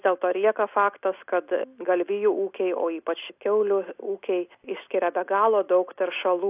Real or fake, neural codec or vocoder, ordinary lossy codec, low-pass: real; none; AAC, 32 kbps; 3.6 kHz